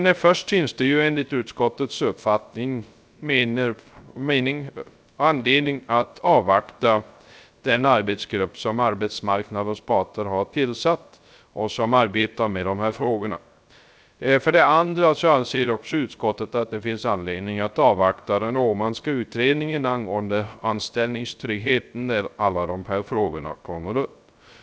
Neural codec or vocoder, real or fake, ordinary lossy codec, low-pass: codec, 16 kHz, 0.3 kbps, FocalCodec; fake; none; none